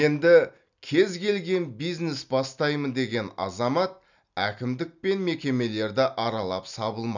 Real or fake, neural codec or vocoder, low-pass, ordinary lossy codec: real; none; 7.2 kHz; none